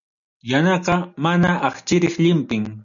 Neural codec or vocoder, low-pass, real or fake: none; 7.2 kHz; real